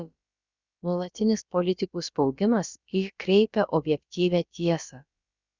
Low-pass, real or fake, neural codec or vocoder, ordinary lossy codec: 7.2 kHz; fake; codec, 16 kHz, about 1 kbps, DyCAST, with the encoder's durations; Opus, 64 kbps